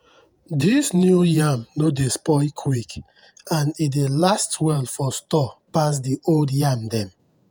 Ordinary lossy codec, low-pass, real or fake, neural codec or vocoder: none; none; fake; vocoder, 48 kHz, 128 mel bands, Vocos